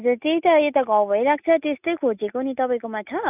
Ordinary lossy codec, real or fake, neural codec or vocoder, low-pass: none; real; none; 3.6 kHz